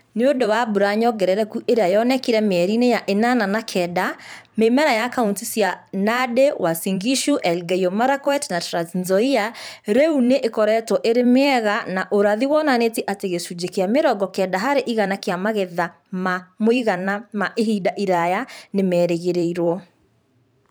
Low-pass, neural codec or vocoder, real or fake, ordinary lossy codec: none; vocoder, 44.1 kHz, 128 mel bands every 512 samples, BigVGAN v2; fake; none